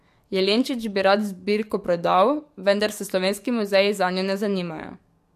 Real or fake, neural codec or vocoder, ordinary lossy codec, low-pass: fake; codec, 44.1 kHz, 7.8 kbps, DAC; MP3, 64 kbps; 14.4 kHz